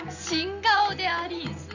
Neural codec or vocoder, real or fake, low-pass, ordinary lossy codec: none; real; 7.2 kHz; none